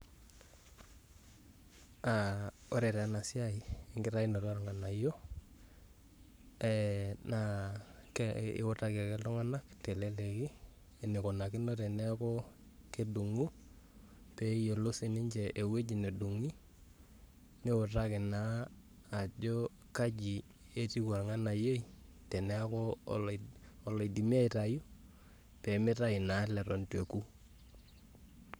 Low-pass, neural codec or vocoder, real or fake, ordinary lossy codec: none; codec, 44.1 kHz, 7.8 kbps, Pupu-Codec; fake; none